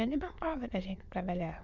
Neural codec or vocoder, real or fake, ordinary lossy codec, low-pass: autoencoder, 22.05 kHz, a latent of 192 numbers a frame, VITS, trained on many speakers; fake; none; 7.2 kHz